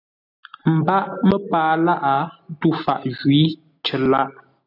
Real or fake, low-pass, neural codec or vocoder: real; 5.4 kHz; none